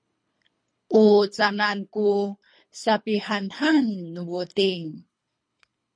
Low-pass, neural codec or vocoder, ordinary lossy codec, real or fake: 9.9 kHz; codec, 24 kHz, 3 kbps, HILCodec; MP3, 48 kbps; fake